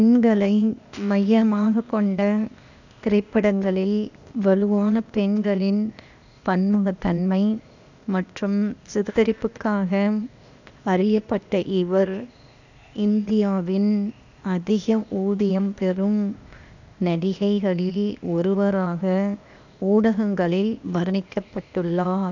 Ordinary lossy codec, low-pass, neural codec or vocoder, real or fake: none; 7.2 kHz; codec, 16 kHz, 0.8 kbps, ZipCodec; fake